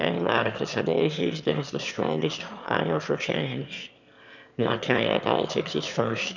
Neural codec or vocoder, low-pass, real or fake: autoencoder, 22.05 kHz, a latent of 192 numbers a frame, VITS, trained on one speaker; 7.2 kHz; fake